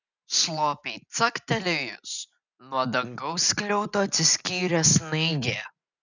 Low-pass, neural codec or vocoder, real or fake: 7.2 kHz; none; real